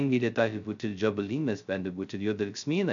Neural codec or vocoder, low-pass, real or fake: codec, 16 kHz, 0.2 kbps, FocalCodec; 7.2 kHz; fake